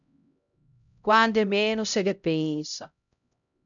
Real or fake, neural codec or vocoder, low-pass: fake; codec, 16 kHz, 0.5 kbps, X-Codec, HuBERT features, trained on LibriSpeech; 7.2 kHz